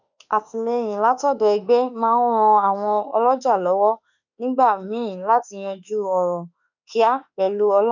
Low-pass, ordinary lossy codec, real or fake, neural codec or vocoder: 7.2 kHz; none; fake; autoencoder, 48 kHz, 32 numbers a frame, DAC-VAE, trained on Japanese speech